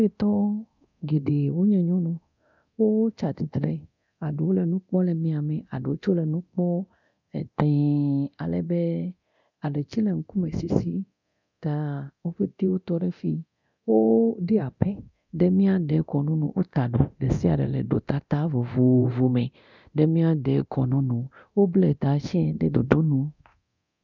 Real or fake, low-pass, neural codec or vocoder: fake; 7.2 kHz; codec, 24 kHz, 0.9 kbps, DualCodec